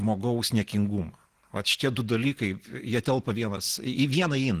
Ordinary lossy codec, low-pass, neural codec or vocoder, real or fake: Opus, 16 kbps; 14.4 kHz; none; real